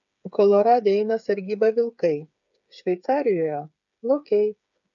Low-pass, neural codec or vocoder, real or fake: 7.2 kHz; codec, 16 kHz, 8 kbps, FreqCodec, smaller model; fake